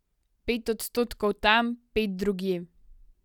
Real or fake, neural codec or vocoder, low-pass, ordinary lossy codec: real; none; 19.8 kHz; none